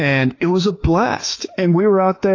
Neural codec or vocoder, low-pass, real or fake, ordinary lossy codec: autoencoder, 48 kHz, 32 numbers a frame, DAC-VAE, trained on Japanese speech; 7.2 kHz; fake; AAC, 32 kbps